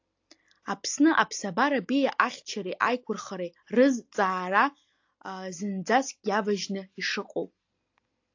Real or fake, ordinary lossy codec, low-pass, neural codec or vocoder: real; AAC, 48 kbps; 7.2 kHz; none